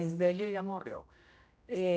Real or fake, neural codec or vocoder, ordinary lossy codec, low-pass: fake; codec, 16 kHz, 0.5 kbps, X-Codec, HuBERT features, trained on general audio; none; none